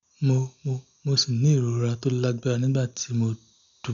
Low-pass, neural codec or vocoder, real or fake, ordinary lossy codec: 7.2 kHz; none; real; none